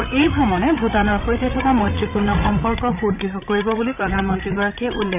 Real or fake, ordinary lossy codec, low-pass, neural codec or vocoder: fake; none; 3.6 kHz; codec, 16 kHz, 16 kbps, FreqCodec, larger model